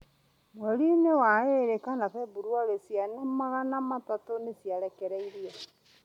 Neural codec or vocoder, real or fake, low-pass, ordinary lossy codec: none; real; 19.8 kHz; MP3, 96 kbps